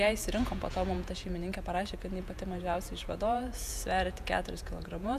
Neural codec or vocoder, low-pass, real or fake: vocoder, 48 kHz, 128 mel bands, Vocos; 14.4 kHz; fake